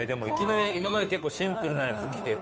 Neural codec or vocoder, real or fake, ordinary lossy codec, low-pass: codec, 16 kHz, 2 kbps, FunCodec, trained on Chinese and English, 25 frames a second; fake; none; none